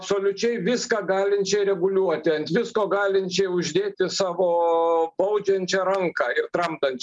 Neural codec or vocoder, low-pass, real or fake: none; 10.8 kHz; real